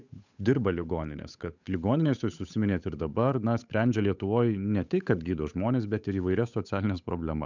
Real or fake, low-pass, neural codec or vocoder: fake; 7.2 kHz; codec, 16 kHz, 8 kbps, FunCodec, trained on Chinese and English, 25 frames a second